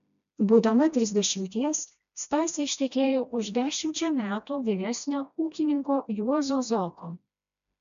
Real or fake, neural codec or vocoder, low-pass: fake; codec, 16 kHz, 1 kbps, FreqCodec, smaller model; 7.2 kHz